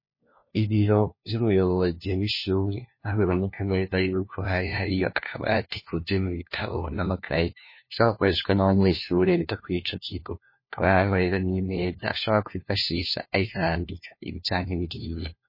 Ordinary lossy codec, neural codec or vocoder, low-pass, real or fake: MP3, 24 kbps; codec, 16 kHz, 1 kbps, FunCodec, trained on LibriTTS, 50 frames a second; 5.4 kHz; fake